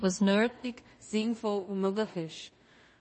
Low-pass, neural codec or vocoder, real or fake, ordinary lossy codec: 10.8 kHz; codec, 16 kHz in and 24 kHz out, 0.4 kbps, LongCat-Audio-Codec, two codebook decoder; fake; MP3, 32 kbps